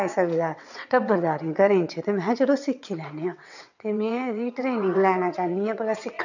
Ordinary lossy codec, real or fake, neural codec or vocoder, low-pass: none; fake; vocoder, 22.05 kHz, 80 mel bands, WaveNeXt; 7.2 kHz